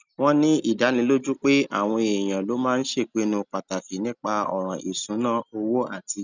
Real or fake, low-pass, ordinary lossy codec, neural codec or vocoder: real; 7.2 kHz; none; none